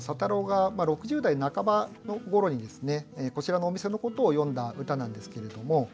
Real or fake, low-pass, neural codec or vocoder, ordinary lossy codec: real; none; none; none